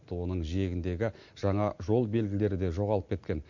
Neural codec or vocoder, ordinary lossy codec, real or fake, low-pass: none; MP3, 48 kbps; real; 7.2 kHz